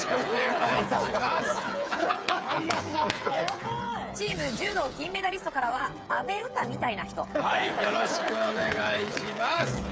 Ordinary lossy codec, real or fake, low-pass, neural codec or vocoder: none; fake; none; codec, 16 kHz, 8 kbps, FreqCodec, smaller model